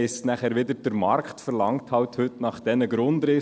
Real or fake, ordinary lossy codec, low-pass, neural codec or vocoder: real; none; none; none